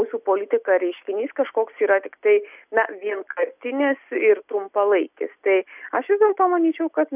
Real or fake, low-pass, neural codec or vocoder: real; 3.6 kHz; none